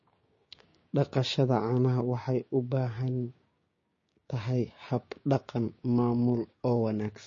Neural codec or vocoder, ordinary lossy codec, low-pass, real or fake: codec, 16 kHz, 6 kbps, DAC; MP3, 32 kbps; 7.2 kHz; fake